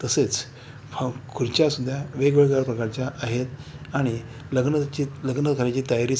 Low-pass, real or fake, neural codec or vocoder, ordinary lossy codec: none; real; none; none